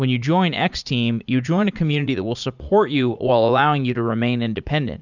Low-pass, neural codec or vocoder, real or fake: 7.2 kHz; vocoder, 44.1 kHz, 80 mel bands, Vocos; fake